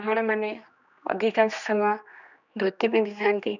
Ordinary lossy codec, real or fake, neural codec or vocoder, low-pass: none; fake; codec, 16 kHz, 2 kbps, X-Codec, HuBERT features, trained on general audio; 7.2 kHz